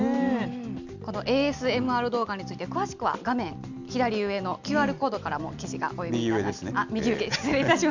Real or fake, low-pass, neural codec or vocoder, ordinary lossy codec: real; 7.2 kHz; none; none